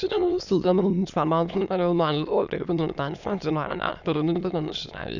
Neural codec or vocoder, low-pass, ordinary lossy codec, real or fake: autoencoder, 22.05 kHz, a latent of 192 numbers a frame, VITS, trained on many speakers; 7.2 kHz; none; fake